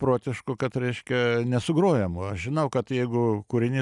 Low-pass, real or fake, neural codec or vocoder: 10.8 kHz; real; none